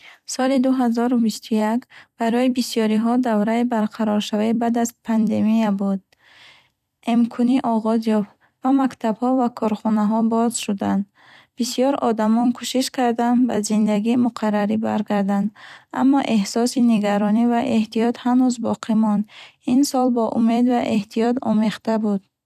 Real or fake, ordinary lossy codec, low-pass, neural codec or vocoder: fake; none; 14.4 kHz; vocoder, 44.1 kHz, 128 mel bands every 256 samples, BigVGAN v2